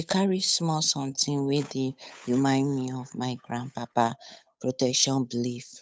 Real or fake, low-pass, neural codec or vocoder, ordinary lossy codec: fake; none; codec, 16 kHz, 8 kbps, FunCodec, trained on Chinese and English, 25 frames a second; none